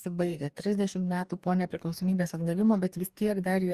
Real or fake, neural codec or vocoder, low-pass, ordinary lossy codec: fake; codec, 44.1 kHz, 2.6 kbps, DAC; 14.4 kHz; Opus, 64 kbps